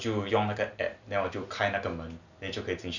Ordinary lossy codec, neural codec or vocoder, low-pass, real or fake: none; none; 7.2 kHz; real